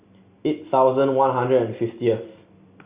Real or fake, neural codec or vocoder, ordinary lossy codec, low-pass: real; none; Opus, 64 kbps; 3.6 kHz